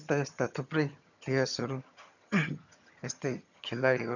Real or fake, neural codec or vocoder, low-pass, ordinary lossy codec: fake; vocoder, 22.05 kHz, 80 mel bands, HiFi-GAN; 7.2 kHz; none